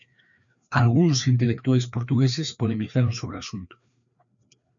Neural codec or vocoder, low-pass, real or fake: codec, 16 kHz, 2 kbps, FreqCodec, larger model; 7.2 kHz; fake